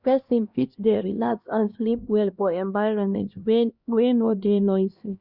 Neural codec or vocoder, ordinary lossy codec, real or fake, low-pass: codec, 16 kHz, 1 kbps, X-Codec, HuBERT features, trained on LibriSpeech; Opus, 64 kbps; fake; 5.4 kHz